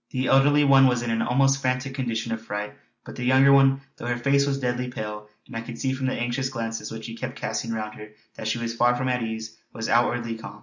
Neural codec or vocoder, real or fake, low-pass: none; real; 7.2 kHz